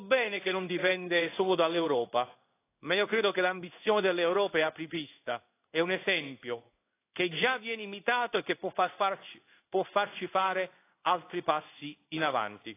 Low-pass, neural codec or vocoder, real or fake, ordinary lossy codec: 3.6 kHz; codec, 16 kHz in and 24 kHz out, 1 kbps, XY-Tokenizer; fake; AAC, 24 kbps